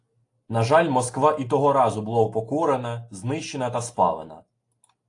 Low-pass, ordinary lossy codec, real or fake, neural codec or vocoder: 10.8 kHz; AAC, 48 kbps; real; none